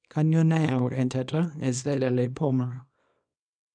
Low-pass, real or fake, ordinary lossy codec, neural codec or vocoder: 9.9 kHz; fake; none; codec, 24 kHz, 0.9 kbps, WavTokenizer, small release